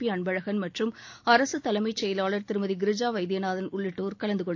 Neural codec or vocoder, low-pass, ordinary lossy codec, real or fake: none; 7.2 kHz; AAC, 48 kbps; real